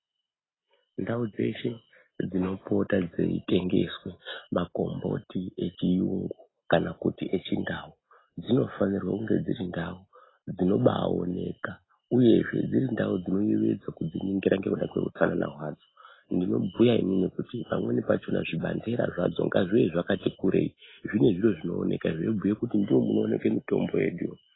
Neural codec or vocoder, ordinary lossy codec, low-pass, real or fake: none; AAC, 16 kbps; 7.2 kHz; real